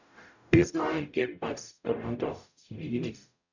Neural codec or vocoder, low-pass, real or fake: codec, 44.1 kHz, 0.9 kbps, DAC; 7.2 kHz; fake